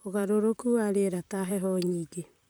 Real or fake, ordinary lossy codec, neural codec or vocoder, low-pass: fake; none; vocoder, 44.1 kHz, 128 mel bands, Pupu-Vocoder; none